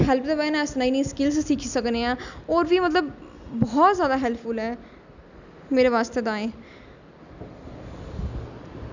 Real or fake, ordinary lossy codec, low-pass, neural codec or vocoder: real; none; 7.2 kHz; none